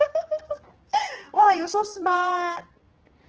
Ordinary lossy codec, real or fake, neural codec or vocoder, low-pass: Opus, 16 kbps; fake; codec, 16 kHz, 2 kbps, X-Codec, HuBERT features, trained on general audio; 7.2 kHz